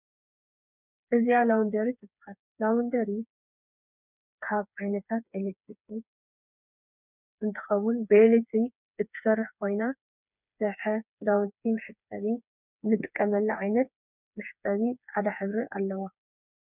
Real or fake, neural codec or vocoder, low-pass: fake; codec, 16 kHz, 4 kbps, FreqCodec, smaller model; 3.6 kHz